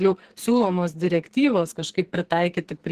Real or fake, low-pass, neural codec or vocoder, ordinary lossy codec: fake; 14.4 kHz; codec, 44.1 kHz, 2.6 kbps, SNAC; Opus, 16 kbps